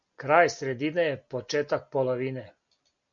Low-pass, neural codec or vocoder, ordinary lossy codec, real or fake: 7.2 kHz; none; MP3, 64 kbps; real